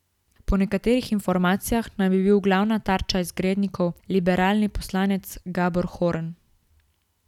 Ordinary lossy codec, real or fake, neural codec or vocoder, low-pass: none; real; none; 19.8 kHz